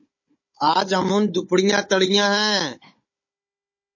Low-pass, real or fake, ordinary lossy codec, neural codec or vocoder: 7.2 kHz; fake; MP3, 32 kbps; codec, 16 kHz, 16 kbps, FunCodec, trained on Chinese and English, 50 frames a second